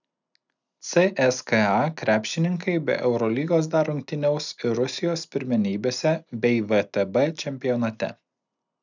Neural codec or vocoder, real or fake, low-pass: none; real; 7.2 kHz